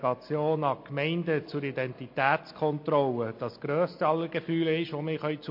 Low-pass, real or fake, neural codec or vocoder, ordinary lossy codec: 5.4 kHz; real; none; MP3, 32 kbps